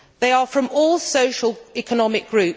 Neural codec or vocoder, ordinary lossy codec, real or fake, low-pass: none; none; real; none